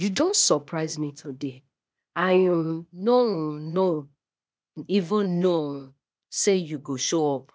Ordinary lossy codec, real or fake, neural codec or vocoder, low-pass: none; fake; codec, 16 kHz, 0.8 kbps, ZipCodec; none